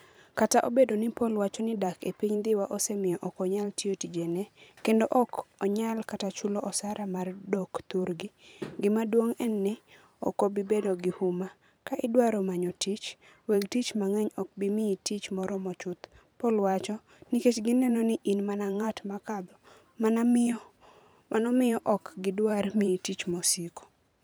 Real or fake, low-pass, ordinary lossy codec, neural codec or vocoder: fake; none; none; vocoder, 44.1 kHz, 128 mel bands every 512 samples, BigVGAN v2